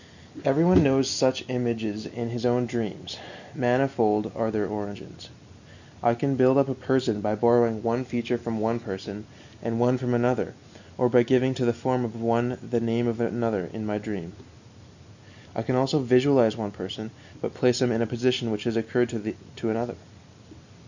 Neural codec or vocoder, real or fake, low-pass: none; real; 7.2 kHz